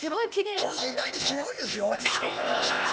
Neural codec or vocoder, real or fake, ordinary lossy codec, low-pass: codec, 16 kHz, 0.8 kbps, ZipCodec; fake; none; none